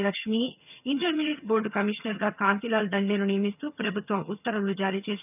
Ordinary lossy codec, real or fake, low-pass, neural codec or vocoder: none; fake; 3.6 kHz; vocoder, 22.05 kHz, 80 mel bands, HiFi-GAN